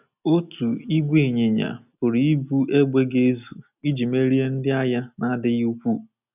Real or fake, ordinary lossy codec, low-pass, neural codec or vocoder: real; none; 3.6 kHz; none